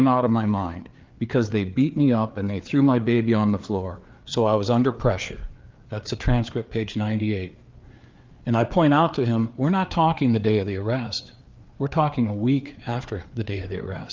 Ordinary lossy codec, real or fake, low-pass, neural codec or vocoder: Opus, 32 kbps; fake; 7.2 kHz; codec, 16 kHz, 4 kbps, FreqCodec, larger model